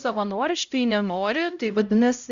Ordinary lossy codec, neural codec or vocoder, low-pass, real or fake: Opus, 64 kbps; codec, 16 kHz, 0.5 kbps, X-Codec, HuBERT features, trained on LibriSpeech; 7.2 kHz; fake